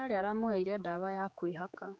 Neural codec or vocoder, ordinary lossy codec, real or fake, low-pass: codec, 16 kHz, 4 kbps, X-Codec, HuBERT features, trained on general audio; none; fake; none